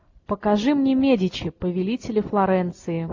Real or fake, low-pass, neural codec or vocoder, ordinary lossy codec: real; 7.2 kHz; none; AAC, 48 kbps